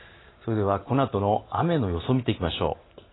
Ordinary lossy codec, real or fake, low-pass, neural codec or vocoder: AAC, 16 kbps; real; 7.2 kHz; none